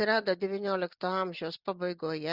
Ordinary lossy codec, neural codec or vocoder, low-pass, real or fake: Opus, 64 kbps; none; 5.4 kHz; real